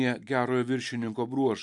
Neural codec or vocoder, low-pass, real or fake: none; 10.8 kHz; real